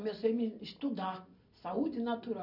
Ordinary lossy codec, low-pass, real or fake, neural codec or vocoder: none; 5.4 kHz; real; none